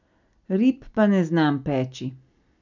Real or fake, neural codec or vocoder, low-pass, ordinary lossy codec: real; none; 7.2 kHz; none